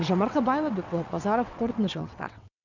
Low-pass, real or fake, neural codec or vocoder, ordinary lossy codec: 7.2 kHz; fake; vocoder, 22.05 kHz, 80 mel bands, WaveNeXt; none